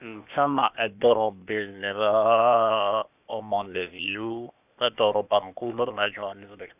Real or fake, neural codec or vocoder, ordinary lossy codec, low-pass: fake; codec, 16 kHz, 0.8 kbps, ZipCodec; none; 3.6 kHz